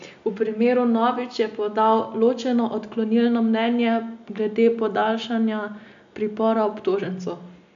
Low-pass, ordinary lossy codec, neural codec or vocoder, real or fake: 7.2 kHz; AAC, 64 kbps; none; real